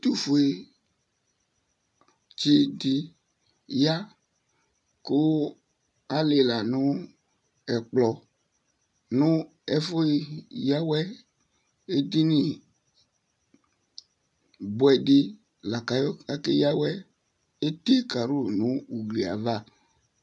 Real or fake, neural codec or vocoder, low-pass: real; none; 10.8 kHz